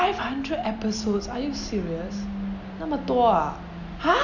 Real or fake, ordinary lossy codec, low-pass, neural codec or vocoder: real; none; 7.2 kHz; none